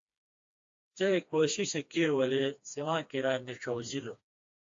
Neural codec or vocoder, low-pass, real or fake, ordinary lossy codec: codec, 16 kHz, 2 kbps, FreqCodec, smaller model; 7.2 kHz; fake; AAC, 48 kbps